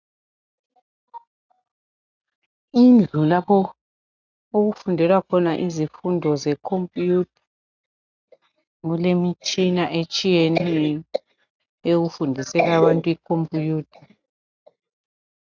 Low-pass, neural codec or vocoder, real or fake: 7.2 kHz; none; real